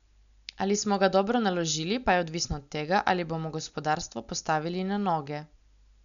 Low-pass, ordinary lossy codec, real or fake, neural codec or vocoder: 7.2 kHz; none; real; none